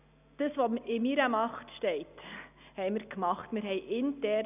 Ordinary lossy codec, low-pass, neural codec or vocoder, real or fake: none; 3.6 kHz; none; real